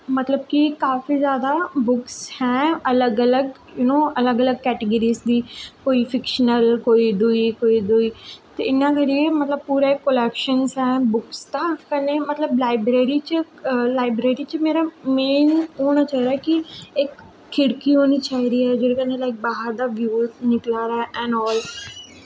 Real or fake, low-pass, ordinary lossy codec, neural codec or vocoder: real; none; none; none